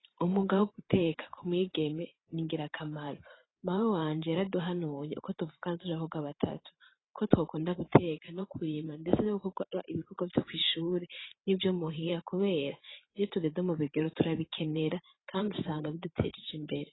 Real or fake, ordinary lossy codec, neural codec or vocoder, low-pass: fake; AAC, 16 kbps; vocoder, 44.1 kHz, 128 mel bands every 512 samples, BigVGAN v2; 7.2 kHz